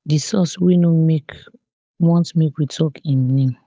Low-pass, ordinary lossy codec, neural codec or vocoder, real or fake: none; none; codec, 16 kHz, 8 kbps, FunCodec, trained on Chinese and English, 25 frames a second; fake